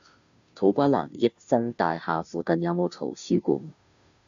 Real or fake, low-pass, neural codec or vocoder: fake; 7.2 kHz; codec, 16 kHz, 0.5 kbps, FunCodec, trained on Chinese and English, 25 frames a second